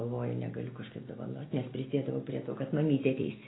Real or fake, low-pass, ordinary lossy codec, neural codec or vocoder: real; 7.2 kHz; AAC, 16 kbps; none